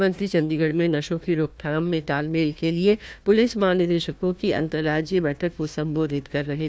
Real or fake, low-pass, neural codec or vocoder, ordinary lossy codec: fake; none; codec, 16 kHz, 1 kbps, FunCodec, trained on Chinese and English, 50 frames a second; none